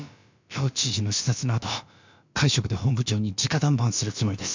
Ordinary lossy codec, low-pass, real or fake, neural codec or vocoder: MP3, 64 kbps; 7.2 kHz; fake; codec, 16 kHz, about 1 kbps, DyCAST, with the encoder's durations